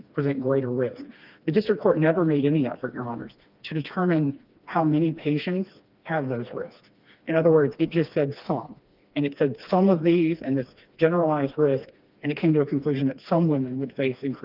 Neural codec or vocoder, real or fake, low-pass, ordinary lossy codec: codec, 16 kHz, 2 kbps, FreqCodec, smaller model; fake; 5.4 kHz; Opus, 24 kbps